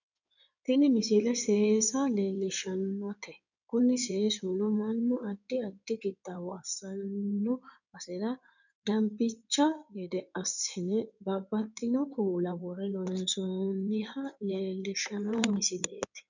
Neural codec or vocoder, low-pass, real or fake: codec, 16 kHz in and 24 kHz out, 2.2 kbps, FireRedTTS-2 codec; 7.2 kHz; fake